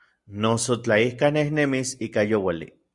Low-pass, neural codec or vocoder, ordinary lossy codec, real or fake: 10.8 kHz; none; Opus, 64 kbps; real